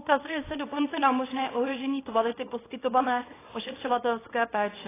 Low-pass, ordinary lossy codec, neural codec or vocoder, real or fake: 3.6 kHz; AAC, 16 kbps; codec, 24 kHz, 0.9 kbps, WavTokenizer, small release; fake